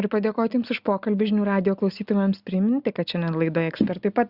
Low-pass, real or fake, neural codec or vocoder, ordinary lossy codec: 5.4 kHz; real; none; Opus, 64 kbps